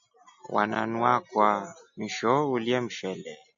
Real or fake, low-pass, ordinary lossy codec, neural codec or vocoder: real; 7.2 kHz; AAC, 64 kbps; none